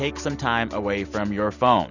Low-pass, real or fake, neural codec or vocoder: 7.2 kHz; real; none